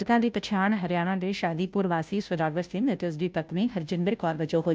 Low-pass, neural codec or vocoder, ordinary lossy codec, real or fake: none; codec, 16 kHz, 0.5 kbps, FunCodec, trained on Chinese and English, 25 frames a second; none; fake